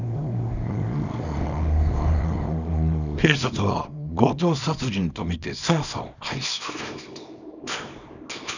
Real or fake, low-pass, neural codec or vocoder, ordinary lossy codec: fake; 7.2 kHz; codec, 24 kHz, 0.9 kbps, WavTokenizer, small release; none